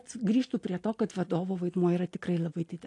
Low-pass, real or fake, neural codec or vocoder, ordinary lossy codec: 10.8 kHz; real; none; AAC, 48 kbps